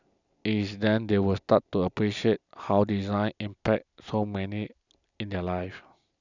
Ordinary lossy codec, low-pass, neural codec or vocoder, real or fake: none; 7.2 kHz; none; real